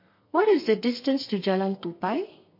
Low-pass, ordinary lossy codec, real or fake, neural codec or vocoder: 5.4 kHz; MP3, 32 kbps; fake; codec, 32 kHz, 1.9 kbps, SNAC